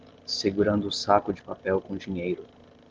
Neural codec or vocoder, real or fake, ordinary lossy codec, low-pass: none; real; Opus, 16 kbps; 7.2 kHz